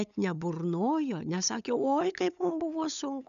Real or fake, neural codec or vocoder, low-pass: fake; codec, 16 kHz, 8 kbps, FunCodec, trained on LibriTTS, 25 frames a second; 7.2 kHz